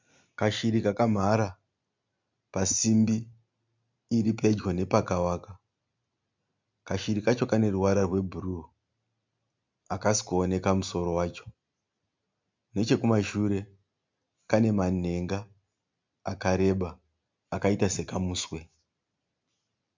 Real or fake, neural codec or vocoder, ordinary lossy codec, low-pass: real; none; MP3, 64 kbps; 7.2 kHz